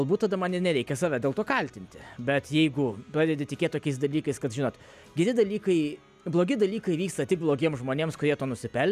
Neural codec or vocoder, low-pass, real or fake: none; 14.4 kHz; real